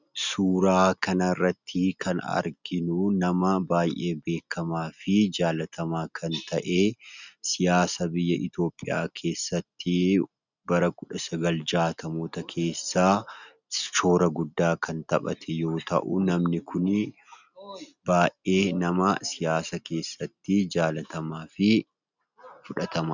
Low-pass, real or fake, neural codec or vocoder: 7.2 kHz; real; none